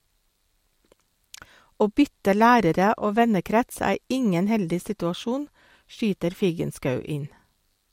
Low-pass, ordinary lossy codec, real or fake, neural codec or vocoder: 19.8 kHz; MP3, 64 kbps; real; none